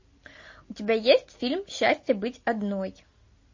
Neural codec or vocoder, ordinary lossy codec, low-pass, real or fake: none; MP3, 32 kbps; 7.2 kHz; real